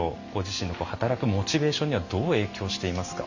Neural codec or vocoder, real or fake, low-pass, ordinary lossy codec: none; real; 7.2 kHz; none